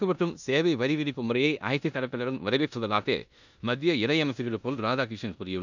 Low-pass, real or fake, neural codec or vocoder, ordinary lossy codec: 7.2 kHz; fake; codec, 16 kHz in and 24 kHz out, 0.9 kbps, LongCat-Audio-Codec, four codebook decoder; none